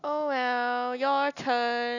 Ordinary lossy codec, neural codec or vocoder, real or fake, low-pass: none; none; real; 7.2 kHz